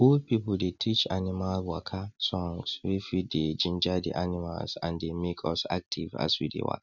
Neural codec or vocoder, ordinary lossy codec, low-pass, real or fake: none; none; 7.2 kHz; real